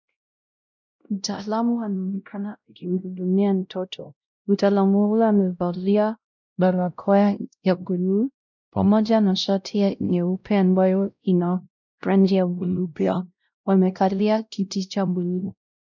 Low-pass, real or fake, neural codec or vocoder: 7.2 kHz; fake; codec, 16 kHz, 0.5 kbps, X-Codec, WavLM features, trained on Multilingual LibriSpeech